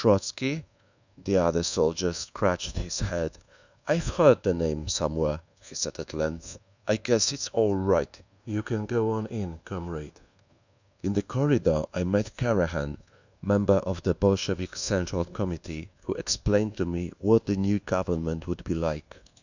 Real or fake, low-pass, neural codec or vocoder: fake; 7.2 kHz; codec, 24 kHz, 1.2 kbps, DualCodec